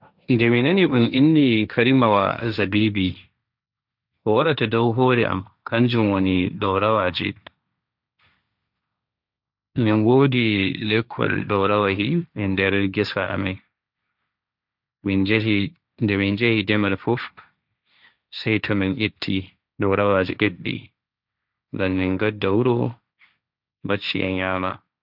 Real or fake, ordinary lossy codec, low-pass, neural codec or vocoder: fake; none; 5.4 kHz; codec, 16 kHz, 1.1 kbps, Voila-Tokenizer